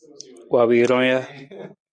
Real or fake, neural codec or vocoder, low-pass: real; none; 9.9 kHz